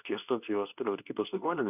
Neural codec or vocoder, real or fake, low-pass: codec, 24 kHz, 0.9 kbps, WavTokenizer, medium speech release version 2; fake; 3.6 kHz